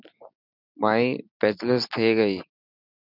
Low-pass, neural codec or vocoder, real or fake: 5.4 kHz; none; real